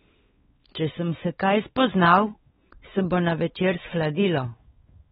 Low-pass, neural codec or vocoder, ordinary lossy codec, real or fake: 7.2 kHz; codec, 16 kHz, 4 kbps, X-Codec, WavLM features, trained on Multilingual LibriSpeech; AAC, 16 kbps; fake